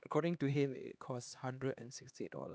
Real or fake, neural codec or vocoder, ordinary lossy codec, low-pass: fake; codec, 16 kHz, 2 kbps, X-Codec, HuBERT features, trained on LibriSpeech; none; none